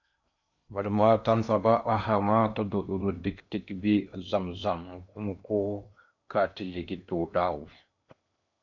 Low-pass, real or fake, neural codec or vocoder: 7.2 kHz; fake; codec, 16 kHz in and 24 kHz out, 0.8 kbps, FocalCodec, streaming, 65536 codes